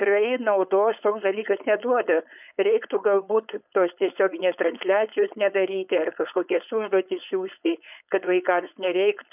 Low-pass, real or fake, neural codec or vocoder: 3.6 kHz; fake; codec, 16 kHz, 4.8 kbps, FACodec